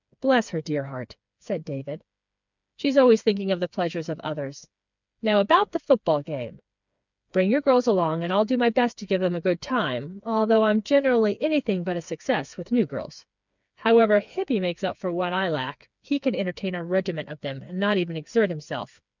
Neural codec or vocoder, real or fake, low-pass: codec, 16 kHz, 4 kbps, FreqCodec, smaller model; fake; 7.2 kHz